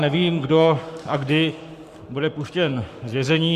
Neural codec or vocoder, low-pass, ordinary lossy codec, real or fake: codec, 44.1 kHz, 7.8 kbps, Pupu-Codec; 14.4 kHz; Opus, 64 kbps; fake